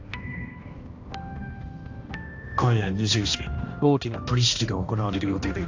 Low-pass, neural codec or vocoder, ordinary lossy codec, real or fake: 7.2 kHz; codec, 16 kHz, 1 kbps, X-Codec, HuBERT features, trained on balanced general audio; AAC, 48 kbps; fake